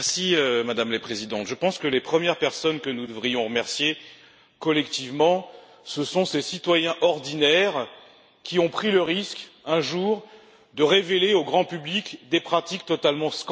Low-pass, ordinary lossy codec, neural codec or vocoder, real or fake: none; none; none; real